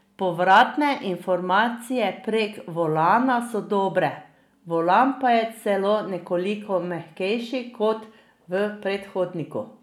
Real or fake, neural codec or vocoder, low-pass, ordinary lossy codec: real; none; 19.8 kHz; none